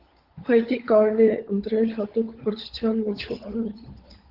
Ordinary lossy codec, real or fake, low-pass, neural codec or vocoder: Opus, 16 kbps; fake; 5.4 kHz; codec, 16 kHz, 16 kbps, FunCodec, trained on LibriTTS, 50 frames a second